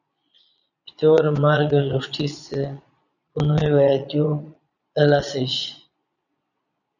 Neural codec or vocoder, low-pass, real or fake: vocoder, 22.05 kHz, 80 mel bands, Vocos; 7.2 kHz; fake